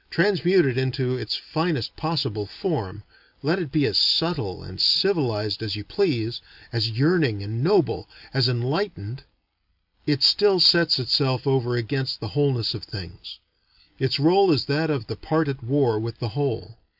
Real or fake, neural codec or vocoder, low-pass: real; none; 5.4 kHz